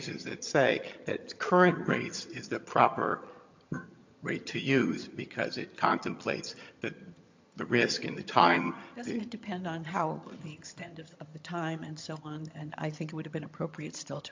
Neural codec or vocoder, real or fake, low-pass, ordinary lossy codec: vocoder, 22.05 kHz, 80 mel bands, HiFi-GAN; fake; 7.2 kHz; MP3, 48 kbps